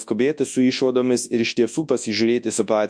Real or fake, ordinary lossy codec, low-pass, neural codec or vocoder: fake; MP3, 64 kbps; 9.9 kHz; codec, 24 kHz, 0.9 kbps, WavTokenizer, large speech release